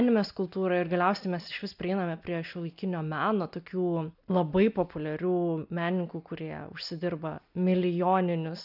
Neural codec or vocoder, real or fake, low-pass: none; real; 5.4 kHz